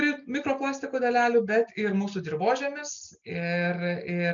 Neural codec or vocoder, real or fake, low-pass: none; real; 7.2 kHz